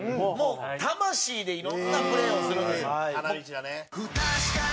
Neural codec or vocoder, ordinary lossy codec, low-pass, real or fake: none; none; none; real